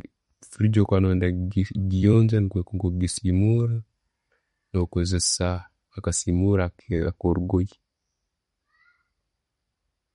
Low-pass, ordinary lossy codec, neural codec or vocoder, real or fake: 19.8 kHz; MP3, 48 kbps; vocoder, 44.1 kHz, 128 mel bands every 256 samples, BigVGAN v2; fake